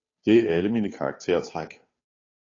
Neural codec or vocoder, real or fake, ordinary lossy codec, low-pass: codec, 16 kHz, 2 kbps, FunCodec, trained on Chinese and English, 25 frames a second; fake; AAC, 32 kbps; 7.2 kHz